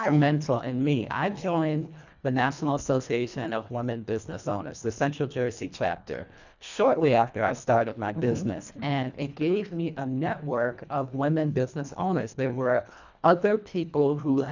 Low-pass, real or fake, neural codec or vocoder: 7.2 kHz; fake; codec, 24 kHz, 1.5 kbps, HILCodec